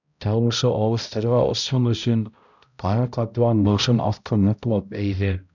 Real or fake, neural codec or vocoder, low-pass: fake; codec, 16 kHz, 0.5 kbps, X-Codec, HuBERT features, trained on balanced general audio; 7.2 kHz